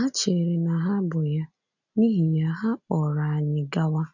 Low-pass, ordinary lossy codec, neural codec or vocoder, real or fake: 7.2 kHz; none; none; real